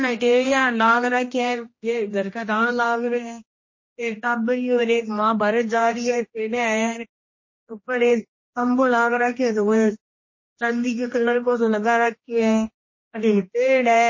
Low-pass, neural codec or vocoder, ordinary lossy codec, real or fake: 7.2 kHz; codec, 16 kHz, 1 kbps, X-Codec, HuBERT features, trained on general audio; MP3, 32 kbps; fake